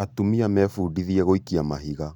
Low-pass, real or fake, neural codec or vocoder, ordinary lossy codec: 19.8 kHz; real; none; none